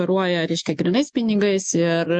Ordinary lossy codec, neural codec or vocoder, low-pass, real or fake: MP3, 48 kbps; autoencoder, 48 kHz, 128 numbers a frame, DAC-VAE, trained on Japanese speech; 10.8 kHz; fake